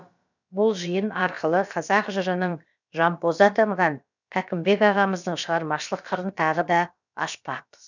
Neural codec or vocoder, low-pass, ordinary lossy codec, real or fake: codec, 16 kHz, about 1 kbps, DyCAST, with the encoder's durations; 7.2 kHz; none; fake